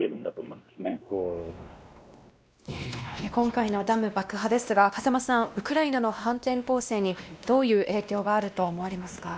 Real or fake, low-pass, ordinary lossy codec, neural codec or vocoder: fake; none; none; codec, 16 kHz, 1 kbps, X-Codec, WavLM features, trained on Multilingual LibriSpeech